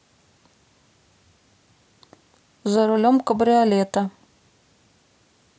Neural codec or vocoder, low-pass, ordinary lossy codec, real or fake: none; none; none; real